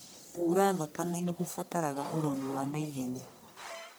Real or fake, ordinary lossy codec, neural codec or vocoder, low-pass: fake; none; codec, 44.1 kHz, 1.7 kbps, Pupu-Codec; none